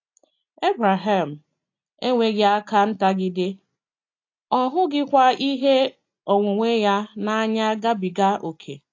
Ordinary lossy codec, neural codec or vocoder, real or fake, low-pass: AAC, 48 kbps; none; real; 7.2 kHz